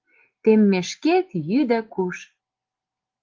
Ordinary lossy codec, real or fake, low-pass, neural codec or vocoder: Opus, 16 kbps; real; 7.2 kHz; none